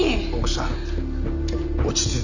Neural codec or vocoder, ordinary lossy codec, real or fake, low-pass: none; none; real; 7.2 kHz